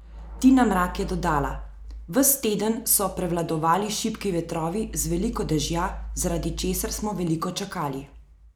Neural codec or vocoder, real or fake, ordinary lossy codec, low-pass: none; real; none; none